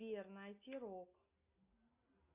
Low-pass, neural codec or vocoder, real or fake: 3.6 kHz; none; real